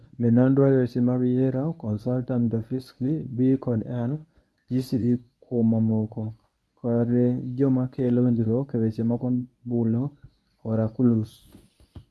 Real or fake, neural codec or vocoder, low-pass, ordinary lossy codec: fake; codec, 24 kHz, 0.9 kbps, WavTokenizer, medium speech release version 1; none; none